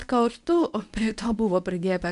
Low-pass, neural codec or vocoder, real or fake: 10.8 kHz; codec, 24 kHz, 0.9 kbps, WavTokenizer, medium speech release version 1; fake